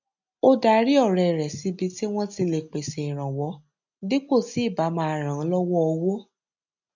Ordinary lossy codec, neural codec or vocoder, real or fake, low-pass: none; none; real; 7.2 kHz